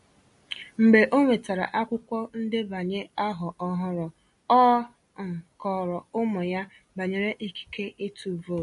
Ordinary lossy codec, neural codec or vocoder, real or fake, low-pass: MP3, 48 kbps; none; real; 14.4 kHz